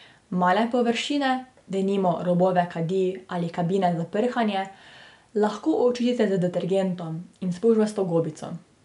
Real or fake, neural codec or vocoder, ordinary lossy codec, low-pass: real; none; none; 10.8 kHz